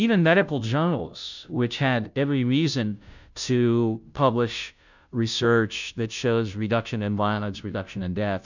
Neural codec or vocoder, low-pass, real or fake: codec, 16 kHz, 0.5 kbps, FunCodec, trained on Chinese and English, 25 frames a second; 7.2 kHz; fake